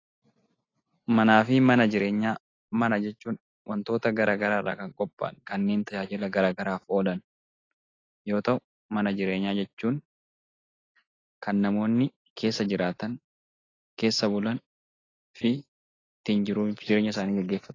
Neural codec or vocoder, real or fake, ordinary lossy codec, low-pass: none; real; AAC, 32 kbps; 7.2 kHz